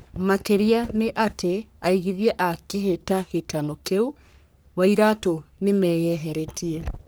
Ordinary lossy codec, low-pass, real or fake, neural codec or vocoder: none; none; fake; codec, 44.1 kHz, 3.4 kbps, Pupu-Codec